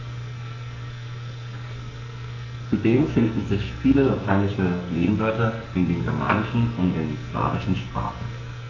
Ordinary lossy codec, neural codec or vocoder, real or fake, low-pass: none; codec, 44.1 kHz, 2.6 kbps, SNAC; fake; 7.2 kHz